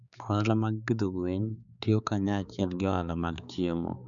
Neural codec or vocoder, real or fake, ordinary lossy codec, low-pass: codec, 16 kHz, 4 kbps, X-Codec, HuBERT features, trained on balanced general audio; fake; none; 7.2 kHz